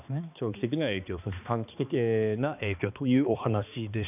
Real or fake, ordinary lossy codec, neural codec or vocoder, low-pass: fake; none; codec, 16 kHz, 2 kbps, X-Codec, HuBERT features, trained on balanced general audio; 3.6 kHz